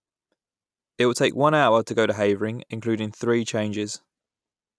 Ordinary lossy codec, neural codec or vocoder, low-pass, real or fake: none; none; none; real